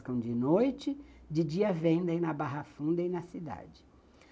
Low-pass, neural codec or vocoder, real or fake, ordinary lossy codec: none; none; real; none